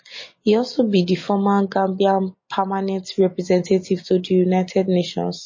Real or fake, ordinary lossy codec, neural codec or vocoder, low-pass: real; MP3, 32 kbps; none; 7.2 kHz